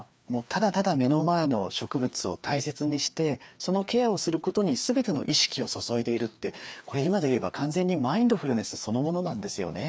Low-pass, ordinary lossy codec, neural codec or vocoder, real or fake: none; none; codec, 16 kHz, 2 kbps, FreqCodec, larger model; fake